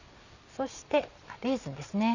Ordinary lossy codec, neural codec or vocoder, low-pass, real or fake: none; none; 7.2 kHz; real